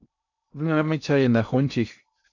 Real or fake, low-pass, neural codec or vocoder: fake; 7.2 kHz; codec, 16 kHz in and 24 kHz out, 0.6 kbps, FocalCodec, streaming, 2048 codes